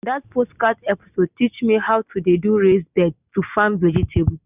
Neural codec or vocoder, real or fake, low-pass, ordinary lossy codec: none; real; 3.6 kHz; none